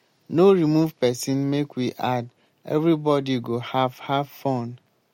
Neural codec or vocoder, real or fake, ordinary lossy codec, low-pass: none; real; MP3, 64 kbps; 19.8 kHz